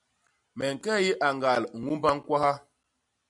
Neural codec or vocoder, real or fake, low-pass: none; real; 10.8 kHz